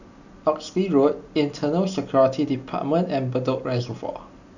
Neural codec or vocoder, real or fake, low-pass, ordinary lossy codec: none; real; 7.2 kHz; none